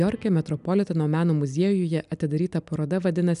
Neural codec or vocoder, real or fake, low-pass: none; real; 10.8 kHz